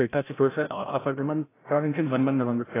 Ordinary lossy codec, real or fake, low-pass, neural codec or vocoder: AAC, 16 kbps; fake; 3.6 kHz; codec, 16 kHz, 0.5 kbps, FreqCodec, larger model